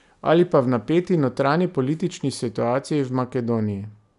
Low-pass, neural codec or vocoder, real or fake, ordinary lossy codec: 10.8 kHz; none; real; none